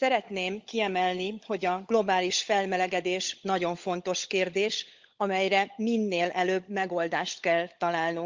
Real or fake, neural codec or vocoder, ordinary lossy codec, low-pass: fake; codec, 16 kHz, 16 kbps, FunCodec, trained on LibriTTS, 50 frames a second; Opus, 32 kbps; 7.2 kHz